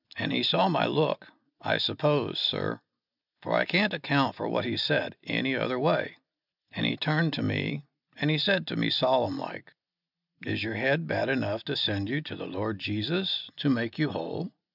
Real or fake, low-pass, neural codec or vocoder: real; 5.4 kHz; none